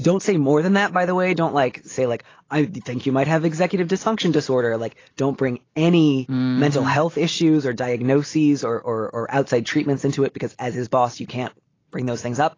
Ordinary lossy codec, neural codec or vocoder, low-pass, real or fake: AAC, 32 kbps; none; 7.2 kHz; real